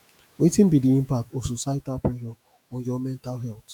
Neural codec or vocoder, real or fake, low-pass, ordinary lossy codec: autoencoder, 48 kHz, 128 numbers a frame, DAC-VAE, trained on Japanese speech; fake; 19.8 kHz; none